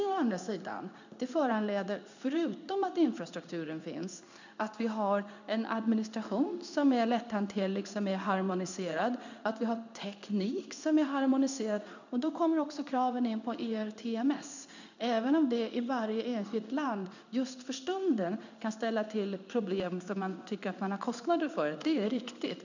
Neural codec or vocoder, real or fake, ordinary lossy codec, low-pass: codec, 16 kHz in and 24 kHz out, 1 kbps, XY-Tokenizer; fake; none; 7.2 kHz